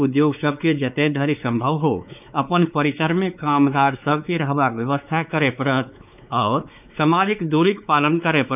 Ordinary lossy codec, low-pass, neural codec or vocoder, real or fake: none; 3.6 kHz; codec, 16 kHz, 4 kbps, X-Codec, WavLM features, trained on Multilingual LibriSpeech; fake